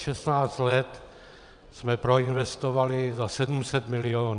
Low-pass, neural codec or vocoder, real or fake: 9.9 kHz; vocoder, 22.05 kHz, 80 mel bands, WaveNeXt; fake